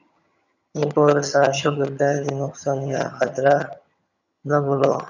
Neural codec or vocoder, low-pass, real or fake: vocoder, 22.05 kHz, 80 mel bands, HiFi-GAN; 7.2 kHz; fake